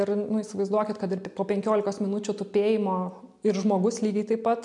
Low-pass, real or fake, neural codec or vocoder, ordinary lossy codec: 10.8 kHz; real; none; MP3, 64 kbps